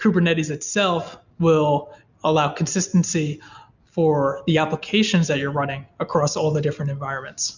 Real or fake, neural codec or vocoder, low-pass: real; none; 7.2 kHz